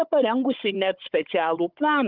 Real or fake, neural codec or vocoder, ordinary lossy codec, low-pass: fake; codec, 16 kHz, 16 kbps, FunCodec, trained on Chinese and English, 50 frames a second; Opus, 32 kbps; 5.4 kHz